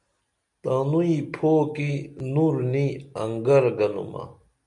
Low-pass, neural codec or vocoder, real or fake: 10.8 kHz; none; real